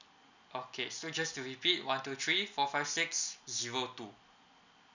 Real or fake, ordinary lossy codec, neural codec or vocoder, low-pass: real; none; none; 7.2 kHz